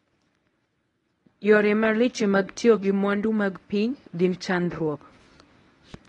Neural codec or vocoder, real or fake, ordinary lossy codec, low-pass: codec, 24 kHz, 0.9 kbps, WavTokenizer, medium speech release version 1; fake; AAC, 32 kbps; 10.8 kHz